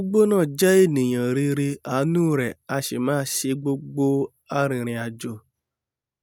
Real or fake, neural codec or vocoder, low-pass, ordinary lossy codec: real; none; none; none